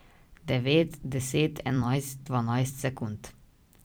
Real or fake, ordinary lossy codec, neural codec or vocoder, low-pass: fake; none; vocoder, 44.1 kHz, 128 mel bands every 256 samples, BigVGAN v2; none